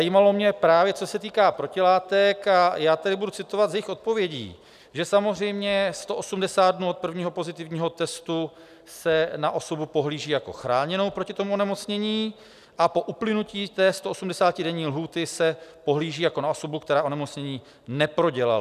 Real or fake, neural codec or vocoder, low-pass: real; none; 14.4 kHz